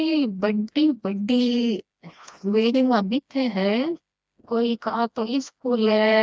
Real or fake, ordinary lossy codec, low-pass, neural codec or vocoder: fake; none; none; codec, 16 kHz, 1 kbps, FreqCodec, smaller model